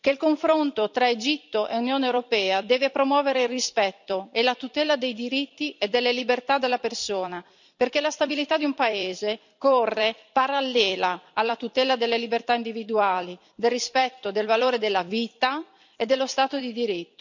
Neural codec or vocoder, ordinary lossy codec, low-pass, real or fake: vocoder, 44.1 kHz, 128 mel bands every 256 samples, BigVGAN v2; none; 7.2 kHz; fake